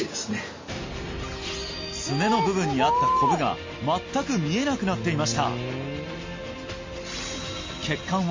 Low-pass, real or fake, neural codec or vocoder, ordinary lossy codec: 7.2 kHz; real; none; MP3, 32 kbps